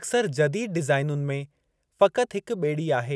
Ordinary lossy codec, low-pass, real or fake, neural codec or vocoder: none; none; real; none